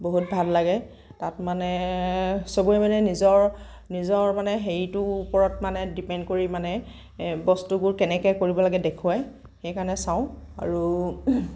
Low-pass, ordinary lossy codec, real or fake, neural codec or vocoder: none; none; real; none